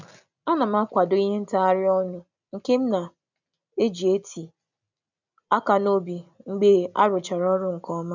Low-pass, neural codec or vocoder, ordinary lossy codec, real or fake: 7.2 kHz; none; none; real